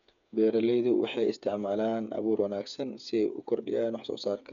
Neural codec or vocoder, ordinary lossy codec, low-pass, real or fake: codec, 16 kHz, 8 kbps, FreqCodec, smaller model; none; 7.2 kHz; fake